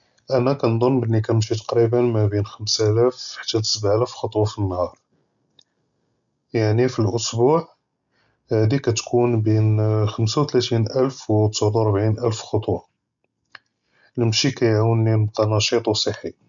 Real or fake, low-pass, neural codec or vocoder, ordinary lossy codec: real; 7.2 kHz; none; none